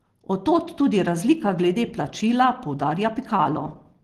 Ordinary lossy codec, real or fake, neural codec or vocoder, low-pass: Opus, 16 kbps; real; none; 14.4 kHz